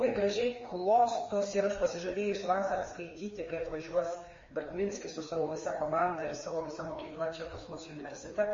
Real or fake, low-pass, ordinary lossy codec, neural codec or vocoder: fake; 7.2 kHz; MP3, 32 kbps; codec, 16 kHz, 2 kbps, FreqCodec, larger model